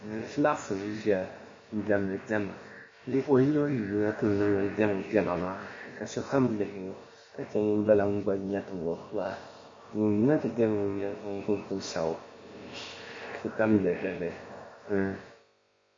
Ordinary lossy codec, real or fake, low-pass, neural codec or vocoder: MP3, 32 kbps; fake; 7.2 kHz; codec, 16 kHz, about 1 kbps, DyCAST, with the encoder's durations